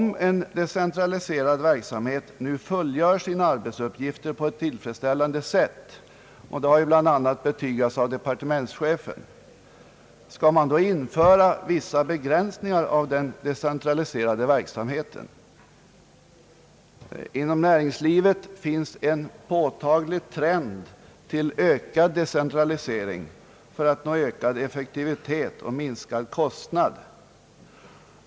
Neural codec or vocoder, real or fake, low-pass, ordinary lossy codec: none; real; none; none